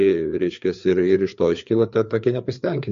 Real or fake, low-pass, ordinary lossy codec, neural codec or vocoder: fake; 7.2 kHz; MP3, 48 kbps; codec, 16 kHz, 4 kbps, FreqCodec, larger model